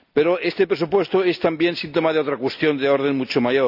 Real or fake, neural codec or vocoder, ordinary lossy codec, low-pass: real; none; none; 5.4 kHz